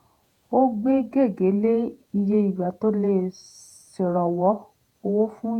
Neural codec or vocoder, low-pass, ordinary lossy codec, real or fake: vocoder, 48 kHz, 128 mel bands, Vocos; 19.8 kHz; none; fake